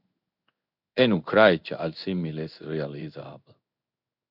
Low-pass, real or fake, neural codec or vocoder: 5.4 kHz; fake; codec, 16 kHz in and 24 kHz out, 1 kbps, XY-Tokenizer